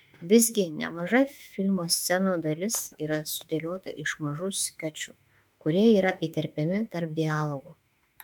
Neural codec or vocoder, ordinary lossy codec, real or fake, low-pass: autoencoder, 48 kHz, 32 numbers a frame, DAC-VAE, trained on Japanese speech; MP3, 96 kbps; fake; 19.8 kHz